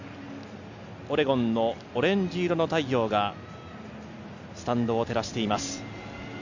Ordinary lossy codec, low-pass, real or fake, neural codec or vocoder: none; 7.2 kHz; real; none